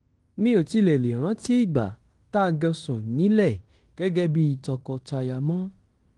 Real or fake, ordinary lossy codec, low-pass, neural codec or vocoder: fake; Opus, 24 kbps; 10.8 kHz; codec, 16 kHz in and 24 kHz out, 0.9 kbps, LongCat-Audio-Codec, fine tuned four codebook decoder